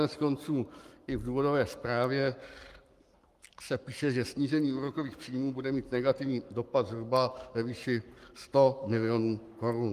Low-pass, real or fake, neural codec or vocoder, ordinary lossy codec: 14.4 kHz; fake; codec, 44.1 kHz, 7.8 kbps, Pupu-Codec; Opus, 32 kbps